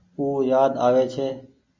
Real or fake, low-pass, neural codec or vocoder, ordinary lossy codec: real; 7.2 kHz; none; MP3, 48 kbps